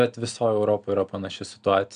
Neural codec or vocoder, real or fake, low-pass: none; real; 9.9 kHz